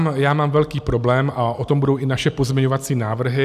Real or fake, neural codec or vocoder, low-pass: real; none; 14.4 kHz